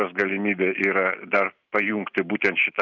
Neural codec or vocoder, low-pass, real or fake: none; 7.2 kHz; real